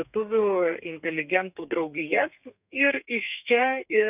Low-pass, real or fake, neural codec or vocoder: 3.6 kHz; fake; codec, 32 kHz, 1.9 kbps, SNAC